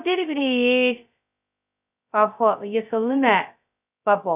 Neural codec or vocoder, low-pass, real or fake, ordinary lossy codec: codec, 16 kHz, 0.2 kbps, FocalCodec; 3.6 kHz; fake; none